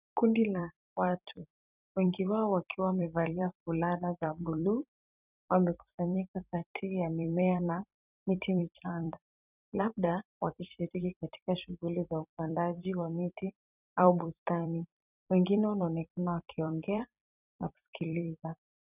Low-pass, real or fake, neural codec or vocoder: 3.6 kHz; fake; vocoder, 44.1 kHz, 128 mel bands every 256 samples, BigVGAN v2